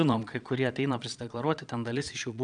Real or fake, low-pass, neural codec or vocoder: fake; 9.9 kHz; vocoder, 22.05 kHz, 80 mel bands, Vocos